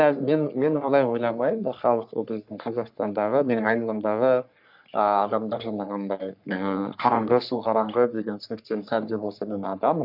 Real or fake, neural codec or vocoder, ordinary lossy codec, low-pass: fake; codec, 44.1 kHz, 3.4 kbps, Pupu-Codec; none; 5.4 kHz